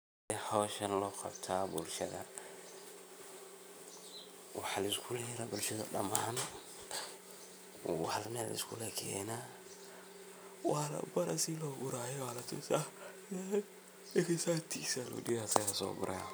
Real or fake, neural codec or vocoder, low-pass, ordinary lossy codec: real; none; none; none